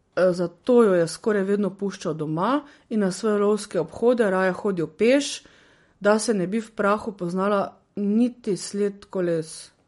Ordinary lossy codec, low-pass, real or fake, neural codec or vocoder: MP3, 48 kbps; 19.8 kHz; real; none